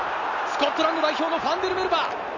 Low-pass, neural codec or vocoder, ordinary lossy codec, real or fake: 7.2 kHz; none; none; real